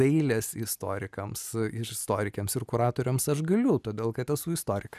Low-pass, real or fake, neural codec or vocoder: 14.4 kHz; real; none